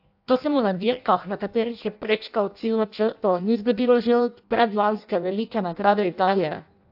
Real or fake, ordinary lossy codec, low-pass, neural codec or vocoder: fake; AAC, 48 kbps; 5.4 kHz; codec, 16 kHz in and 24 kHz out, 0.6 kbps, FireRedTTS-2 codec